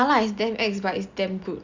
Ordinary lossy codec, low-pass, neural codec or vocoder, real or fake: Opus, 64 kbps; 7.2 kHz; none; real